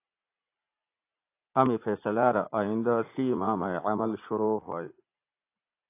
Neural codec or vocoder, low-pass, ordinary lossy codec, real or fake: vocoder, 44.1 kHz, 80 mel bands, Vocos; 3.6 kHz; AAC, 24 kbps; fake